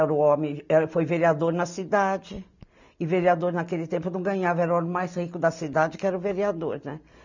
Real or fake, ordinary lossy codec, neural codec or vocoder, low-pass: real; none; none; 7.2 kHz